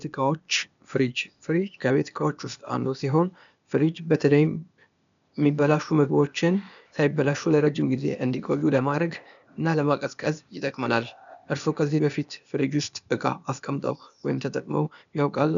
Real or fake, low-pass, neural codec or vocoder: fake; 7.2 kHz; codec, 16 kHz, 0.8 kbps, ZipCodec